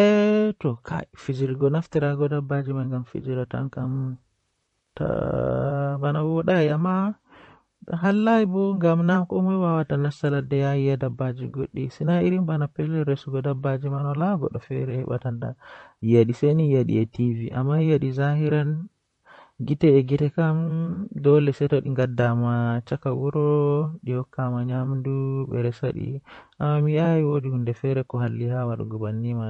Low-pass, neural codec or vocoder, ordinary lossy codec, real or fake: 19.8 kHz; vocoder, 44.1 kHz, 128 mel bands, Pupu-Vocoder; MP3, 48 kbps; fake